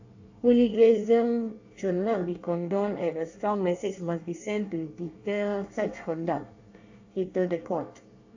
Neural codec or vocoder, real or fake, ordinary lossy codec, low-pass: codec, 24 kHz, 1 kbps, SNAC; fake; AAC, 48 kbps; 7.2 kHz